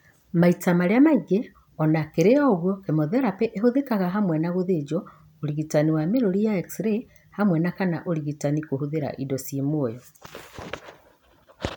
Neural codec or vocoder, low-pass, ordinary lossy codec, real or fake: none; 19.8 kHz; none; real